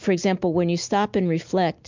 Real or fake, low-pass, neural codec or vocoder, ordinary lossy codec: real; 7.2 kHz; none; MP3, 64 kbps